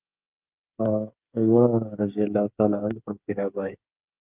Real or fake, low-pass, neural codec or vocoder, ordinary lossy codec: fake; 3.6 kHz; codec, 16 kHz, 8 kbps, FreqCodec, smaller model; Opus, 32 kbps